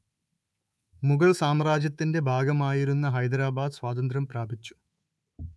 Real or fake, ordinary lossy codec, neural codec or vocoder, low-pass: fake; none; codec, 24 kHz, 3.1 kbps, DualCodec; none